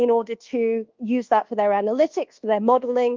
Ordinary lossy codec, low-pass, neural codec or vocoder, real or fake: Opus, 24 kbps; 7.2 kHz; codec, 24 kHz, 1.2 kbps, DualCodec; fake